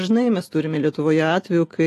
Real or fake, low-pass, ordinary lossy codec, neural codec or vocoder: real; 14.4 kHz; AAC, 48 kbps; none